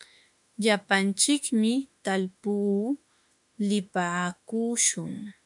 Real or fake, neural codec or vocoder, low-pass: fake; autoencoder, 48 kHz, 32 numbers a frame, DAC-VAE, trained on Japanese speech; 10.8 kHz